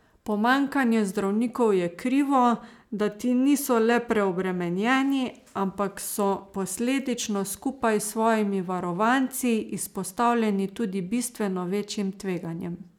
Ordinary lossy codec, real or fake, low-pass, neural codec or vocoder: none; real; 19.8 kHz; none